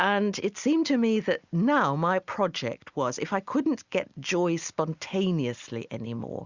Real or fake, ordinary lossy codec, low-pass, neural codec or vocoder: real; Opus, 64 kbps; 7.2 kHz; none